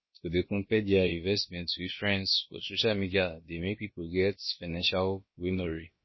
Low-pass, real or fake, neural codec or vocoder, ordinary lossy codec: 7.2 kHz; fake; codec, 16 kHz, about 1 kbps, DyCAST, with the encoder's durations; MP3, 24 kbps